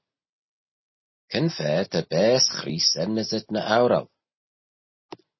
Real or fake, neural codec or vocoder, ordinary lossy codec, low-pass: real; none; MP3, 24 kbps; 7.2 kHz